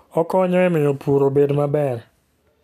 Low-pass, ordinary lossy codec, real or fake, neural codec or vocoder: 14.4 kHz; none; real; none